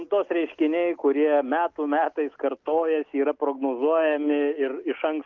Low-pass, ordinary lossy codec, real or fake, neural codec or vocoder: 7.2 kHz; Opus, 24 kbps; real; none